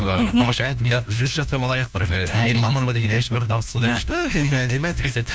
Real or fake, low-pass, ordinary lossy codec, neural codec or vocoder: fake; none; none; codec, 16 kHz, 1 kbps, FunCodec, trained on LibriTTS, 50 frames a second